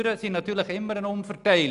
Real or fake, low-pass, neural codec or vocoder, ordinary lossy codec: real; 10.8 kHz; none; none